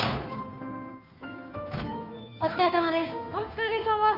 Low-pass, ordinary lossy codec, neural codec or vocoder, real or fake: 5.4 kHz; AAC, 48 kbps; codec, 16 kHz, 2 kbps, FunCodec, trained on Chinese and English, 25 frames a second; fake